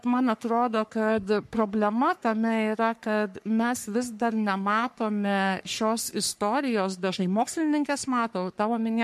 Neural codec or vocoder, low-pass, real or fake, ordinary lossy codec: codec, 44.1 kHz, 3.4 kbps, Pupu-Codec; 14.4 kHz; fake; MP3, 64 kbps